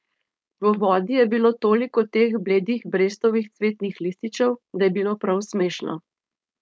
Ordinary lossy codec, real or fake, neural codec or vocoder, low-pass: none; fake; codec, 16 kHz, 4.8 kbps, FACodec; none